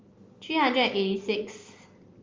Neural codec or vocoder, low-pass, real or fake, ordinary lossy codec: none; 7.2 kHz; real; Opus, 32 kbps